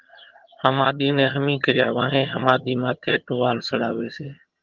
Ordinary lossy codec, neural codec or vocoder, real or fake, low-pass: Opus, 24 kbps; vocoder, 22.05 kHz, 80 mel bands, HiFi-GAN; fake; 7.2 kHz